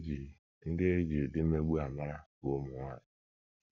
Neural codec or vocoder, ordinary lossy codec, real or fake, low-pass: codec, 44.1 kHz, 7.8 kbps, Pupu-Codec; none; fake; 7.2 kHz